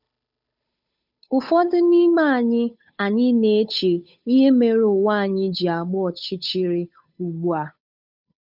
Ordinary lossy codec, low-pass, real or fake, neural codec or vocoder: none; 5.4 kHz; fake; codec, 16 kHz, 8 kbps, FunCodec, trained on Chinese and English, 25 frames a second